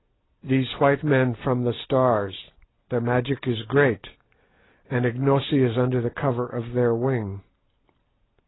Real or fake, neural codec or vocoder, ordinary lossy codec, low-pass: real; none; AAC, 16 kbps; 7.2 kHz